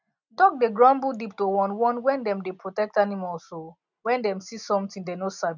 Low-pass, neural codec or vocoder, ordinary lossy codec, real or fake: 7.2 kHz; none; none; real